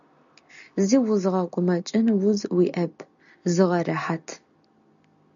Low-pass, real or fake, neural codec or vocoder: 7.2 kHz; real; none